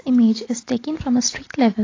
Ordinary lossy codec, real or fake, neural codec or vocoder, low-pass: AAC, 32 kbps; real; none; 7.2 kHz